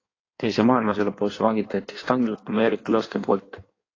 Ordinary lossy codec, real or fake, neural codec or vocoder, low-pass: AAC, 32 kbps; fake; codec, 16 kHz in and 24 kHz out, 1.1 kbps, FireRedTTS-2 codec; 7.2 kHz